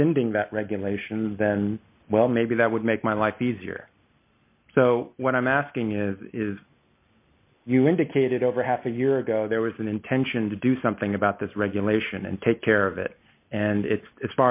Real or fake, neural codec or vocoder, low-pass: real; none; 3.6 kHz